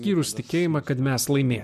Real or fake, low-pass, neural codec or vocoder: real; 14.4 kHz; none